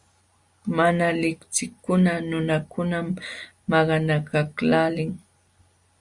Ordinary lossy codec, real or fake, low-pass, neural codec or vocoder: Opus, 64 kbps; real; 10.8 kHz; none